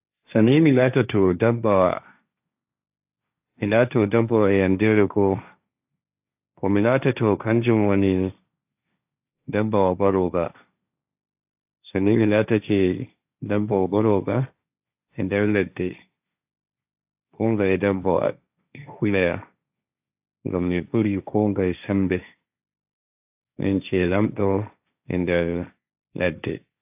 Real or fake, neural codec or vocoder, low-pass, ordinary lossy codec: fake; codec, 16 kHz, 1.1 kbps, Voila-Tokenizer; 3.6 kHz; AAC, 32 kbps